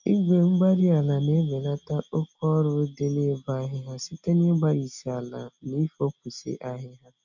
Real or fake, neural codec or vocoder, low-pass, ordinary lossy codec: real; none; 7.2 kHz; none